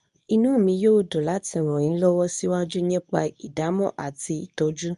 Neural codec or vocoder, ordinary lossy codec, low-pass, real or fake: codec, 24 kHz, 0.9 kbps, WavTokenizer, medium speech release version 2; none; 10.8 kHz; fake